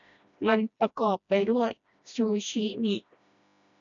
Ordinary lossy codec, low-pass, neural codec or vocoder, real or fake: MP3, 96 kbps; 7.2 kHz; codec, 16 kHz, 1 kbps, FreqCodec, smaller model; fake